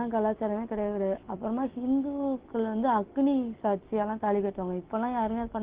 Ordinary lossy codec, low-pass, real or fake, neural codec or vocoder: Opus, 16 kbps; 3.6 kHz; real; none